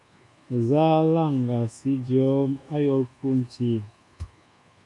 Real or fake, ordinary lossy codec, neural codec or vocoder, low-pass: fake; MP3, 64 kbps; codec, 24 kHz, 1.2 kbps, DualCodec; 10.8 kHz